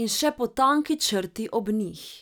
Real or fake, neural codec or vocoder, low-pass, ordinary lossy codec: real; none; none; none